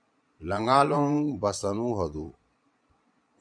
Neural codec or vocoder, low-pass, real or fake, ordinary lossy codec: vocoder, 22.05 kHz, 80 mel bands, Vocos; 9.9 kHz; fake; MP3, 96 kbps